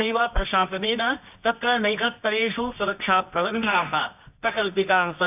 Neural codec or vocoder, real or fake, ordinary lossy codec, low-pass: codec, 24 kHz, 0.9 kbps, WavTokenizer, medium music audio release; fake; AAC, 32 kbps; 3.6 kHz